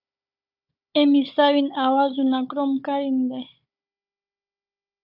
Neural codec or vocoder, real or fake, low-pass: codec, 16 kHz, 16 kbps, FunCodec, trained on Chinese and English, 50 frames a second; fake; 5.4 kHz